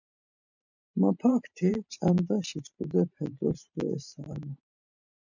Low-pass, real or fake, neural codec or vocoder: 7.2 kHz; fake; vocoder, 44.1 kHz, 128 mel bands every 512 samples, BigVGAN v2